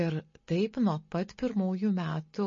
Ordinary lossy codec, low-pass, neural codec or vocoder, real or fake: MP3, 32 kbps; 7.2 kHz; none; real